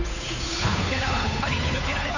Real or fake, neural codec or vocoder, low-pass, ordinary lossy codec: fake; codec, 16 kHz, 8 kbps, FunCodec, trained on Chinese and English, 25 frames a second; 7.2 kHz; none